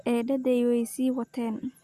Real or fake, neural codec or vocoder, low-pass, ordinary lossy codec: real; none; 14.4 kHz; Opus, 64 kbps